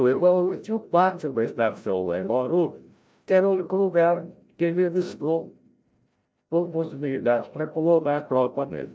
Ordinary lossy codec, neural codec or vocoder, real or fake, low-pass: none; codec, 16 kHz, 0.5 kbps, FreqCodec, larger model; fake; none